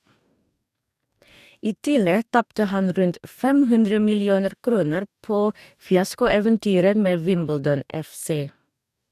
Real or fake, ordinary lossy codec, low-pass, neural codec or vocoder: fake; none; 14.4 kHz; codec, 44.1 kHz, 2.6 kbps, DAC